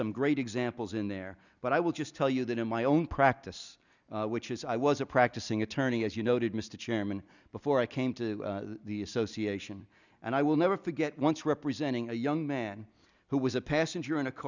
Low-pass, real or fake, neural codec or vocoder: 7.2 kHz; real; none